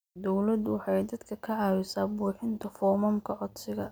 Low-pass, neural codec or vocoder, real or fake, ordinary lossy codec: none; none; real; none